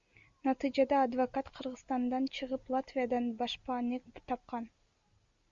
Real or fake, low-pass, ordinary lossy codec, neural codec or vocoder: real; 7.2 kHz; Opus, 64 kbps; none